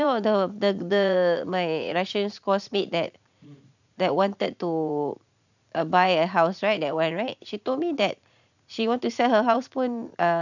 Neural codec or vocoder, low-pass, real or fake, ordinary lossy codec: vocoder, 44.1 kHz, 80 mel bands, Vocos; 7.2 kHz; fake; none